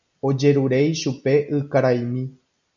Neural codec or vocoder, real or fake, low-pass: none; real; 7.2 kHz